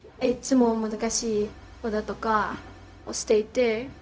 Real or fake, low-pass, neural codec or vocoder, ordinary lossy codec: fake; none; codec, 16 kHz, 0.4 kbps, LongCat-Audio-Codec; none